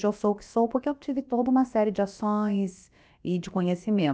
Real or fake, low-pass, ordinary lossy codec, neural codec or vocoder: fake; none; none; codec, 16 kHz, about 1 kbps, DyCAST, with the encoder's durations